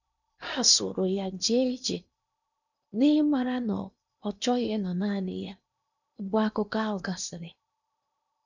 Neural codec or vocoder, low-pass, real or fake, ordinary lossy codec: codec, 16 kHz in and 24 kHz out, 0.8 kbps, FocalCodec, streaming, 65536 codes; 7.2 kHz; fake; none